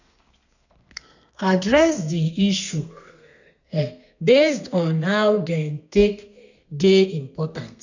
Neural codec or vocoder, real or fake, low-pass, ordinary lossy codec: codec, 32 kHz, 1.9 kbps, SNAC; fake; 7.2 kHz; none